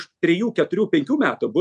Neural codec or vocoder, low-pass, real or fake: none; 10.8 kHz; real